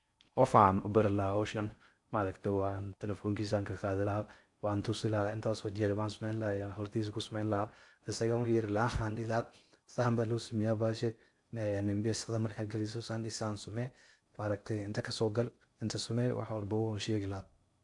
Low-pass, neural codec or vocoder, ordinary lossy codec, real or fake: 10.8 kHz; codec, 16 kHz in and 24 kHz out, 0.6 kbps, FocalCodec, streaming, 4096 codes; AAC, 64 kbps; fake